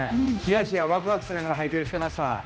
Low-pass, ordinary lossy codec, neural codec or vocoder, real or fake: none; none; codec, 16 kHz, 1 kbps, X-Codec, HuBERT features, trained on general audio; fake